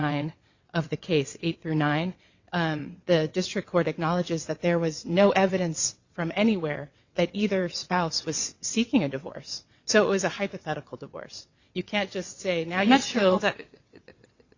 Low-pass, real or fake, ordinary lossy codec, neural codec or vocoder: 7.2 kHz; fake; Opus, 64 kbps; vocoder, 22.05 kHz, 80 mel bands, WaveNeXt